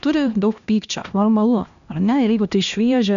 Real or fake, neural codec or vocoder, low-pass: fake; codec, 16 kHz, 1 kbps, X-Codec, HuBERT features, trained on LibriSpeech; 7.2 kHz